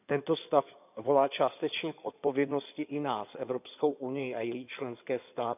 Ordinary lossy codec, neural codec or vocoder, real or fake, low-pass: none; codec, 16 kHz in and 24 kHz out, 2.2 kbps, FireRedTTS-2 codec; fake; 3.6 kHz